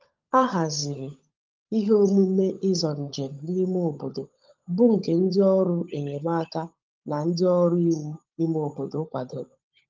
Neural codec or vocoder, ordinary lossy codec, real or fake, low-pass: codec, 16 kHz, 16 kbps, FunCodec, trained on LibriTTS, 50 frames a second; Opus, 24 kbps; fake; 7.2 kHz